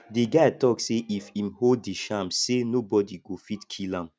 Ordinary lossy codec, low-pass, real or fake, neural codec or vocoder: none; none; real; none